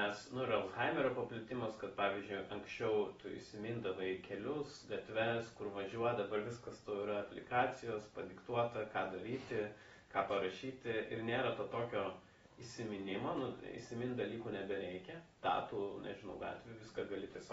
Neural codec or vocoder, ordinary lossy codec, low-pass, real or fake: none; AAC, 24 kbps; 14.4 kHz; real